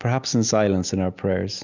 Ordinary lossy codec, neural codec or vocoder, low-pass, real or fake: Opus, 64 kbps; none; 7.2 kHz; real